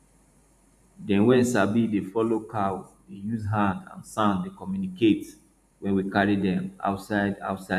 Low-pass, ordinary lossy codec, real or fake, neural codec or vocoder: 14.4 kHz; none; fake; vocoder, 44.1 kHz, 128 mel bands every 512 samples, BigVGAN v2